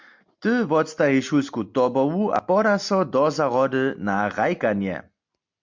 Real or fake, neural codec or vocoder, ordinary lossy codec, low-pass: real; none; MP3, 64 kbps; 7.2 kHz